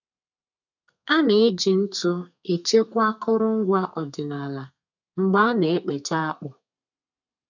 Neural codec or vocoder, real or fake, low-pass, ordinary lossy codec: codec, 32 kHz, 1.9 kbps, SNAC; fake; 7.2 kHz; none